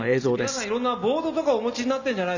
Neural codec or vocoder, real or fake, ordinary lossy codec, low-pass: none; real; none; 7.2 kHz